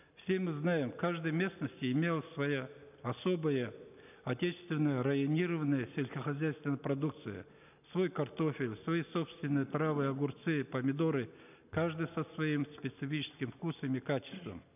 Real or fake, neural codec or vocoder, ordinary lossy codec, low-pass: real; none; none; 3.6 kHz